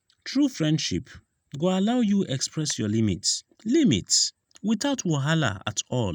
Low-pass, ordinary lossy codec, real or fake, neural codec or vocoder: 19.8 kHz; none; real; none